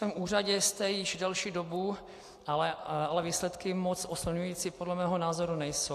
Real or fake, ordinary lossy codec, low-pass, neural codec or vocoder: real; AAC, 64 kbps; 14.4 kHz; none